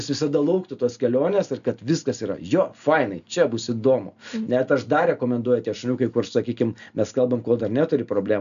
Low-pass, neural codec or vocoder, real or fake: 7.2 kHz; none; real